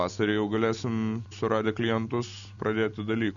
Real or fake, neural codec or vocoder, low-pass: real; none; 7.2 kHz